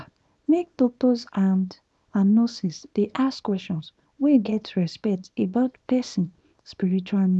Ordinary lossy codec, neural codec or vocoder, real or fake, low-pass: none; codec, 24 kHz, 0.9 kbps, WavTokenizer, small release; fake; none